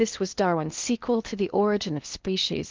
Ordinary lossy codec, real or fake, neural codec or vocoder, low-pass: Opus, 24 kbps; fake; codec, 16 kHz in and 24 kHz out, 0.8 kbps, FocalCodec, streaming, 65536 codes; 7.2 kHz